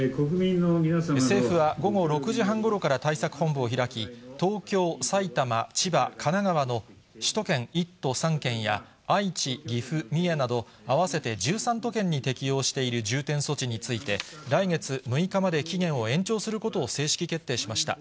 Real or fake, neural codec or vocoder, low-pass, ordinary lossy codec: real; none; none; none